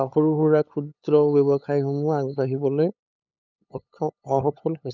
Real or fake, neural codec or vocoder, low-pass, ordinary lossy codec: fake; codec, 16 kHz, 8 kbps, FunCodec, trained on LibriTTS, 25 frames a second; 7.2 kHz; none